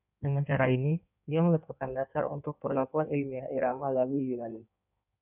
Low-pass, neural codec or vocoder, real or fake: 3.6 kHz; codec, 16 kHz in and 24 kHz out, 1.1 kbps, FireRedTTS-2 codec; fake